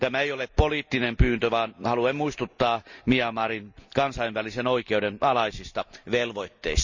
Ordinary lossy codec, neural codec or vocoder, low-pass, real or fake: Opus, 64 kbps; none; 7.2 kHz; real